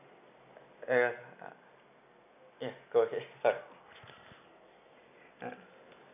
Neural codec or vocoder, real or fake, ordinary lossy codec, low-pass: none; real; none; 3.6 kHz